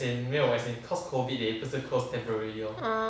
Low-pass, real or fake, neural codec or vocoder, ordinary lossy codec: none; real; none; none